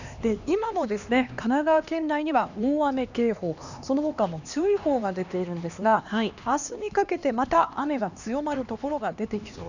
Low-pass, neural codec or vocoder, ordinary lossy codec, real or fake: 7.2 kHz; codec, 16 kHz, 2 kbps, X-Codec, HuBERT features, trained on LibriSpeech; none; fake